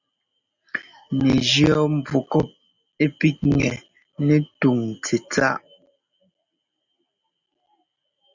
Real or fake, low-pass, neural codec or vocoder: real; 7.2 kHz; none